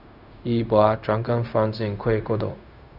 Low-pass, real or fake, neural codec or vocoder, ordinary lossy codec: 5.4 kHz; fake; codec, 16 kHz, 0.4 kbps, LongCat-Audio-Codec; none